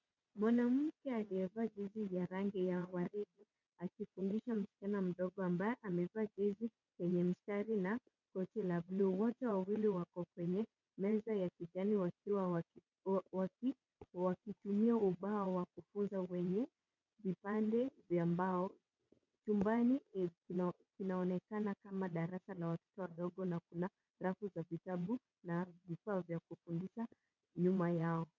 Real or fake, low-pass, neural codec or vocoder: fake; 7.2 kHz; vocoder, 22.05 kHz, 80 mel bands, WaveNeXt